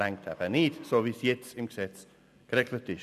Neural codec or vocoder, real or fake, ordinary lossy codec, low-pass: none; real; none; 14.4 kHz